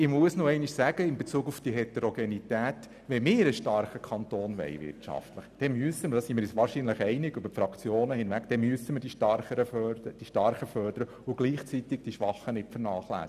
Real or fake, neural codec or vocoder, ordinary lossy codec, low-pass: real; none; none; 14.4 kHz